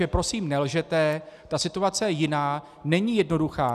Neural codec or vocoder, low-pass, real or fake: none; 14.4 kHz; real